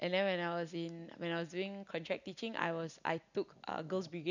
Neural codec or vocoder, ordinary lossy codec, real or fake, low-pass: none; none; real; 7.2 kHz